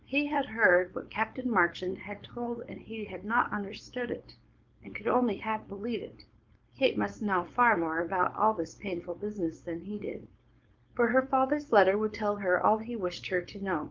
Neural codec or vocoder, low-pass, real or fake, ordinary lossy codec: codec, 16 kHz, 4.8 kbps, FACodec; 7.2 kHz; fake; Opus, 24 kbps